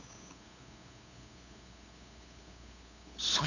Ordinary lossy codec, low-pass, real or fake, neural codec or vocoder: none; 7.2 kHz; fake; codec, 16 kHz, 8 kbps, FunCodec, trained on LibriTTS, 25 frames a second